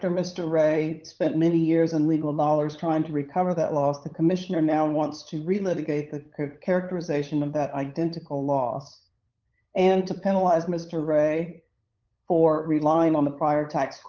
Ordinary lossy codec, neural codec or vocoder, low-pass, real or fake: Opus, 24 kbps; codec, 16 kHz, 8 kbps, FunCodec, trained on LibriTTS, 25 frames a second; 7.2 kHz; fake